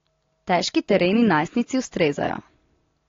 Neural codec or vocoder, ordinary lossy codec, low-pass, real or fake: none; AAC, 32 kbps; 7.2 kHz; real